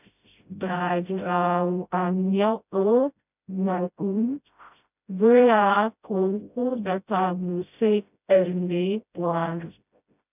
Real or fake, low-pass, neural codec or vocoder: fake; 3.6 kHz; codec, 16 kHz, 0.5 kbps, FreqCodec, smaller model